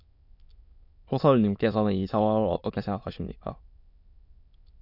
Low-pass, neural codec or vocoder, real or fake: 5.4 kHz; autoencoder, 22.05 kHz, a latent of 192 numbers a frame, VITS, trained on many speakers; fake